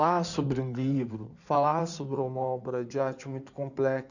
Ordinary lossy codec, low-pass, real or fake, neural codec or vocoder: none; 7.2 kHz; fake; codec, 16 kHz in and 24 kHz out, 2.2 kbps, FireRedTTS-2 codec